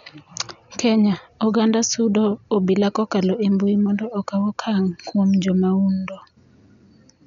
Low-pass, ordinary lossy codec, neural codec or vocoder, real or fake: 7.2 kHz; none; none; real